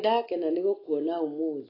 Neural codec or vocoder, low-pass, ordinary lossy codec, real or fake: none; 5.4 kHz; AAC, 24 kbps; real